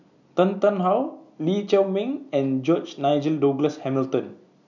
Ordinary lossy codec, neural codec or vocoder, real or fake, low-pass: none; none; real; 7.2 kHz